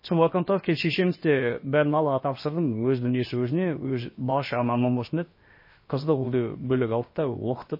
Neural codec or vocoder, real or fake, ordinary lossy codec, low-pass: codec, 16 kHz, about 1 kbps, DyCAST, with the encoder's durations; fake; MP3, 24 kbps; 5.4 kHz